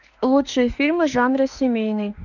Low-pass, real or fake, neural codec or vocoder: 7.2 kHz; fake; autoencoder, 48 kHz, 32 numbers a frame, DAC-VAE, trained on Japanese speech